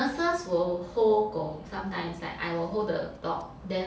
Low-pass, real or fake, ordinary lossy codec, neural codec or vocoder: none; real; none; none